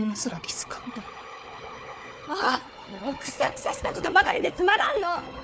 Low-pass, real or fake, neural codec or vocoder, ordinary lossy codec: none; fake; codec, 16 kHz, 4 kbps, FunCodec, trained on Chinese and English, 50 frames a second; none